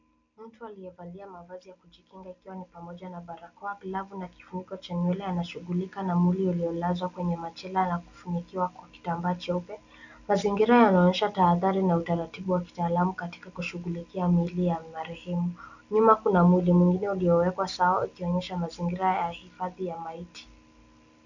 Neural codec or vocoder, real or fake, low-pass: none; real; 7.2 kHz